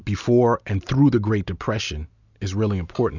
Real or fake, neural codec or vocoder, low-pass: real; none; 7.2 kHz